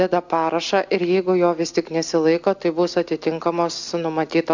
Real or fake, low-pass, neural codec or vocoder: real; 7.2 kHz; none